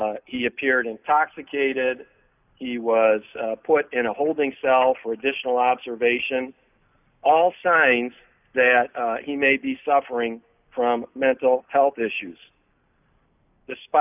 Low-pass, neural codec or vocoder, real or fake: 3.6 kHz; none; real